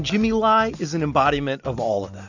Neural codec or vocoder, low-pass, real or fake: none; 7.2 kHz; real